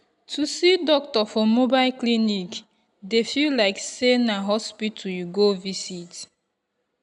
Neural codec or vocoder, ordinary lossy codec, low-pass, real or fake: none; none; 10.8 kHz; real